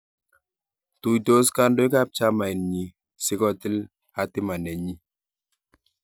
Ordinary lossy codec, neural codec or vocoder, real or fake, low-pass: none; none; real; none